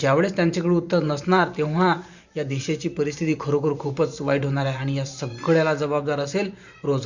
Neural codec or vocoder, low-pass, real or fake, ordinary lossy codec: vocoder, 44.1 kHz, 128 mel bands every 512 samples, BigVGAN v2; 7.2 kHz; fake; Opus, 64 kbps